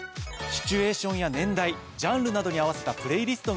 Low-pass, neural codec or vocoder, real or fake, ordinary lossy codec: none; none; real; none